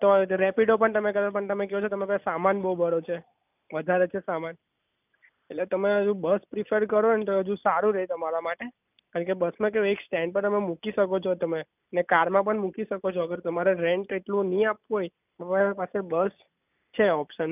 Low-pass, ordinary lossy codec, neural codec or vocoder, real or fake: 3.6 kHz; none; none; real